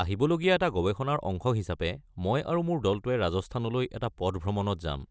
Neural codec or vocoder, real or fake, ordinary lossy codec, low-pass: none; real; none; none